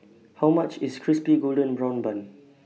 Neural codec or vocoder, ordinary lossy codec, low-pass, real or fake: none; none; none; real